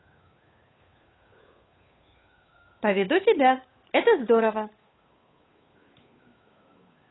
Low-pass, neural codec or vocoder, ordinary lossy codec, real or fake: 7.2 kHz; codec, 16 kHz, 8 kbps, FunCodec, trained on Chinese and English, 25 frames a second; AAC, 16 kbps; fake